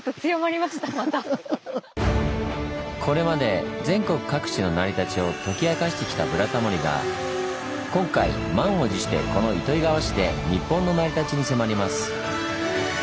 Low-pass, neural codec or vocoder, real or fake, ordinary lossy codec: none; none; real; none